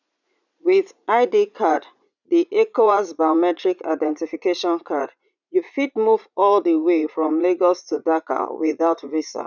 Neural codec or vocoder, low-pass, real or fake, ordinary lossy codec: vocoder, 44.1 kHz, 80 mel bands, Vocos; 7.2 kHz; fake; none